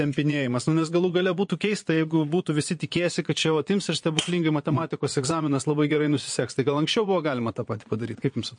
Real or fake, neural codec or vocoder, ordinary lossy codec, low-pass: fake; vocoder, 22.05 kHz, 80 mel bands, Vocos; MP3, 48 kbps; 9.9 kHz